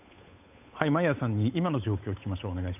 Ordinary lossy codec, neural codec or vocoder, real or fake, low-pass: none; codec, 16 kHz, 8 kbps, FunCodec, trained on Chinese and English, 25 frames a second; fake; 3.6 kHz